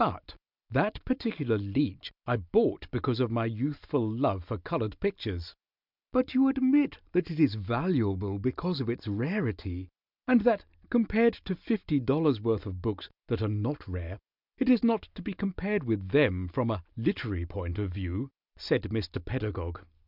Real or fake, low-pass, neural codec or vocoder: real; 5.4 kHz; none